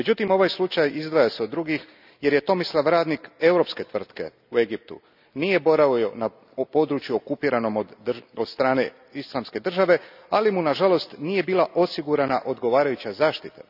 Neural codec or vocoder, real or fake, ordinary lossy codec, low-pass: none; real; none; 5.4 kHz